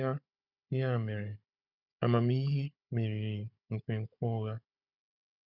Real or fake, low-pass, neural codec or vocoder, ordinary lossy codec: fake; 5.4 kHz; codec, 16 kHz, 16 kbps, FunCodec, trained on Chinese and English, 50 frames a second; none